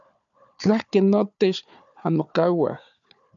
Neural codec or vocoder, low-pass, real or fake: codec, 16 kHz, 4 kbps, FunCodec, trained on Chinese and English, 50 frames a second; 7.2 kHz; fake